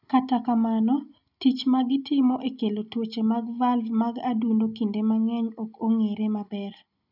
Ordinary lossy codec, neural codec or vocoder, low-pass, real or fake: none; none; 5.4 kHz; real